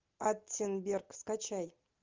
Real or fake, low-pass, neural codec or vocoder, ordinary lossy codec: real; 7.2 kHz; none; Opus, 32 kbps